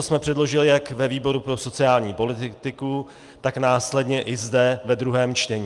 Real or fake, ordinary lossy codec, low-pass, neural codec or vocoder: real; Opus, 32 kbps; 10.8 kHz; none